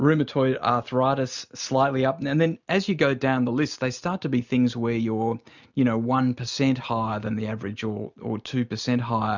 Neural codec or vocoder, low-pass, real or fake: none; 7.2 kHz; real